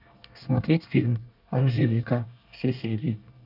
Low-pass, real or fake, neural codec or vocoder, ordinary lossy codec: 5.4 kHz; fake; codec, 24 kHz, 1 kbps, SNAC; none